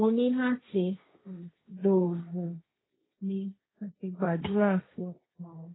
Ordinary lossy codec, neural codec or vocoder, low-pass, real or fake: AAC, 16 kbps; codec, 16 kHz, 1.1 kbps, Voila-Tokenizer; 7.2 kHz; fake